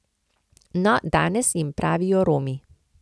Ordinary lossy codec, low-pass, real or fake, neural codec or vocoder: none; none; real; none